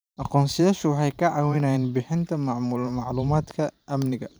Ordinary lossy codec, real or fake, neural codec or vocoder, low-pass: none; fake; vocoder, 44.1 kHz, 128 mel bands every 512 samples, BigVGAN v2; none